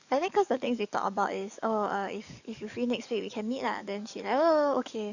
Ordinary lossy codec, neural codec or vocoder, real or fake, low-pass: none; codec, 44.1 kHz, 7.8 kbps, DAC; fake; 7.2 kHz